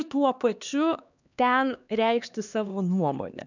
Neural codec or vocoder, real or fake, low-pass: codec, 16 kHz, 2 kbps, X-Codec, HuBERT features, trained on LibriSpeech; fake; 7.2 kHz